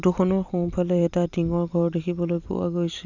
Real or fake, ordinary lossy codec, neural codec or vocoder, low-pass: fake; none; vocoder, 44.1 kHz, 128 mel bands every 256 samples, BigVGAN v2; 7.2 kHz